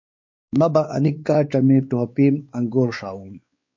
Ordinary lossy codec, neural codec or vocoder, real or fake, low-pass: MP3, 48 kbps; codec, 16 kHz, 4 kbps, X-Codec, WavLM features, trained on Multilingual LibriSpeech; fake; 7.2 kHz